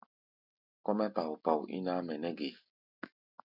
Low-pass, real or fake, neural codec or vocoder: 5.4 kHz; real; none